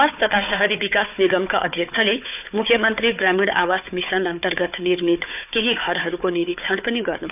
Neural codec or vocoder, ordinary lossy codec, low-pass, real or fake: codec, 16 kHz, 4 kbps, FunCodec, trained on Chinese and English, 50 frames a second; none; 3.6 kHz; fake